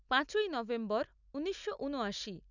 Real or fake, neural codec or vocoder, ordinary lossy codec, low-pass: real; none; none; 7.2 kHz